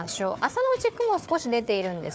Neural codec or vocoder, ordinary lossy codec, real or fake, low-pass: codec, 16 kHz, 4 kbps, FunCodec, trained on Chinese and English, 50 frames a second; none; fake; none